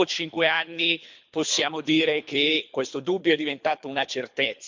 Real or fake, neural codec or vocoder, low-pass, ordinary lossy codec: fake; codec, 24 kHz, 3 kbps, HILCodec; 7.2 kHz; MP3, 64 kbps